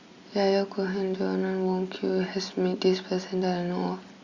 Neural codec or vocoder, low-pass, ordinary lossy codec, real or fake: none; 7.2 kHz; Opus, 64 kbps; real